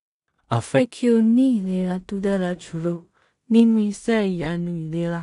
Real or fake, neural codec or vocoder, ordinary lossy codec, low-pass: fake; codec, 16 kHz in and 24 kHz out, 0.4 kbps, LongCat-Audio-Codec, two codebook decoder; none; 10.8 kHz